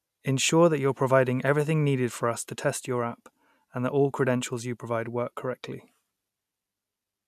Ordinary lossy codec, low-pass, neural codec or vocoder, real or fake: none; 14.4 kHz; none; real